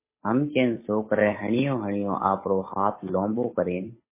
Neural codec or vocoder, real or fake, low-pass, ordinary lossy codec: codec, 16 kHz, 8 kbps, FunCodec, trained on Chinese and English, 25 frames a second; fake; 3.6 kHz; MP3, 16 kbps